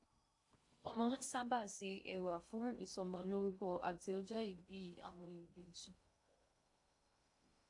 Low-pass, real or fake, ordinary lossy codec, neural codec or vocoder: 10.8 kHz; fake; AAC, 64 kbps; codec, 16 kHz in and 24 kHz out, 0.6 kbps, FocalCodec, streaming, 2048 codes